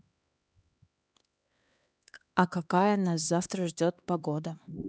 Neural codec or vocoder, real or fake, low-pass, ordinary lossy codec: codec, 16 kHz, 2 kbps, X-Codec, HuBERT features, trained on LibriSpeech; fake; none; none